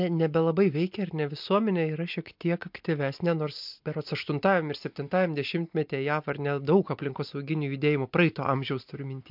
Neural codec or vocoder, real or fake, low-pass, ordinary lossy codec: none; real; 5.4 kHz; MP3, 48 kbps